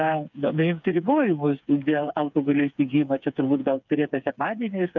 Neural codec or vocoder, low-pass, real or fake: codec, 16 kHz, 4 kbps, FreqCodec, smaller model; 7.2 kHz; fake